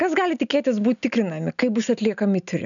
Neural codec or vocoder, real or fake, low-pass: none; real; 7.2 kHz